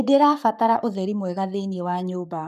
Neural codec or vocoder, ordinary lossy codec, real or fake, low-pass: codec, 44.1 kHz, 7.8 kbps, Pupu-Codec; none; fake; 14.4 kHz